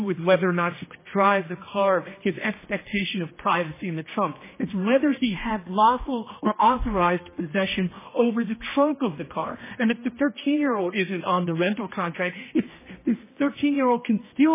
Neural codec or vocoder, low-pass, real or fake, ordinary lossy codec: codec, 16 kHz, 1 kbps, X-Codec, HuBERT features, trained on general audio; 3.6 kHz; fake; MP3, 16 kbps